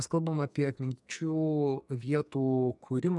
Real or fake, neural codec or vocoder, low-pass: fake; codec, 44.1 kHz, 2.6 kbps, SNAC; 10.8 kHz